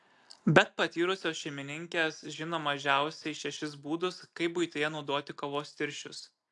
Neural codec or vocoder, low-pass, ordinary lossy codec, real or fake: none; 10.8 kHz; AAC, 64 kbps; real